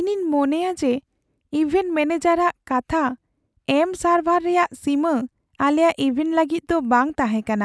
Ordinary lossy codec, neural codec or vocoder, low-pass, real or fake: none; none; none; real